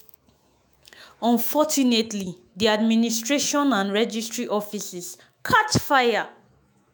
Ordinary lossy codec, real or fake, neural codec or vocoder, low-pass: none; fake; autoencoder, 48 kHz, 128 numbers a frame, DAC-VAE, trained on Japanese speech; none